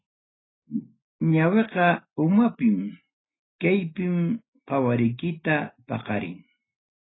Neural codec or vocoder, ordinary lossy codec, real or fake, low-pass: none; AAC, 16 kbps; real; 7.2 kHz